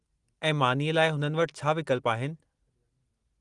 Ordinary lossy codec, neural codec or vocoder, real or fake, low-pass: Opus, 24 kbps; none; real; 10.8 kHz